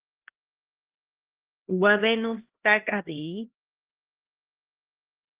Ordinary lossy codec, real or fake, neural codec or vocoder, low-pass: Opus, 16 kbps; fake; codec, 16 kHz, 1 kbps, X-Codec, HuBERT features, trained on LibriSpeech; 3.6 kHz